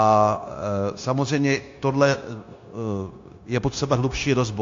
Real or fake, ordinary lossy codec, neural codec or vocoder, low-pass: fake; AAC, 48 kbps; codec, 16 kHz, 0.9 kbps, LongCat-Audio-Codec; 7.2 kHz